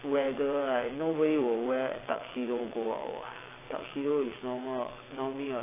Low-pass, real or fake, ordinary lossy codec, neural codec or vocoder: 3.6 kHz; fake; AAC, 16 kbps; vocoder, 22.05 kHz, 80 mel bands, WaveNeXt